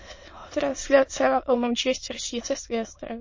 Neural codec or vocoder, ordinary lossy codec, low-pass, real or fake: autoencoder, 22.05 kHz, a latent of 192 numbers a frame, VITS, trained on many speakers; MP3, 32 kbps; 7.2 kHz; fake